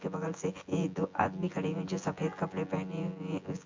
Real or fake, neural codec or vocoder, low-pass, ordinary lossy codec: fake; vocoder, 24 kHz, 100 mel bands, Vocos; 7.2 kHz; MP3, 48 kbps